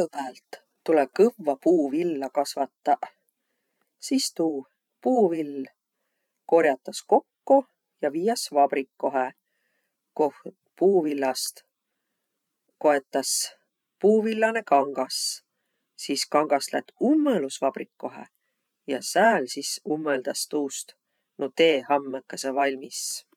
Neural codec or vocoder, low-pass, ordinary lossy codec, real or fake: vocoder, 44.1 kHz, 128 mel bands every 512 samples, BigVGAN v2; 19.8 kHz; none; fake